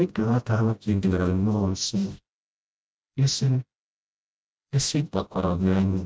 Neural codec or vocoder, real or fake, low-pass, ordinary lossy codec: codec, 16 kHz, 0.5 kbps, FreqCodec, smaller model; fake; none; none